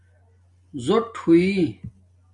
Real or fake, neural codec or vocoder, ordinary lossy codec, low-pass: real; none; MP3, 48 kbps; 10.8 kHz